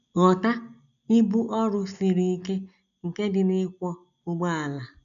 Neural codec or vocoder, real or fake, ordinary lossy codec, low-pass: none; real; none; 7.2 kHz